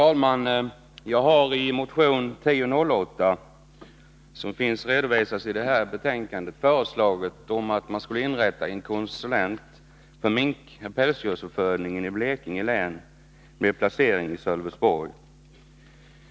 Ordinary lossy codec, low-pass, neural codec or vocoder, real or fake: none; none; none; real